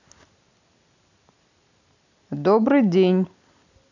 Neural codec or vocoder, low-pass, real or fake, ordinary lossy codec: none; 7.2 kHz; real; none